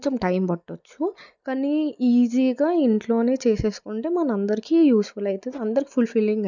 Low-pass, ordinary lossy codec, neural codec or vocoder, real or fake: 7.2 kHz; none; none; real